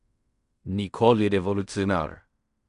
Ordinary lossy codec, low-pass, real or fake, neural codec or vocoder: none; 10.8 kHz; fake; codec, 16 kHz in and 24 kHz out, 0.4 kbps, LongCat-Audio-Codec, fine tuned four codebook decoder